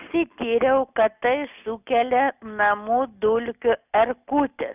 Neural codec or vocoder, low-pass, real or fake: none; 3.6 kHz; real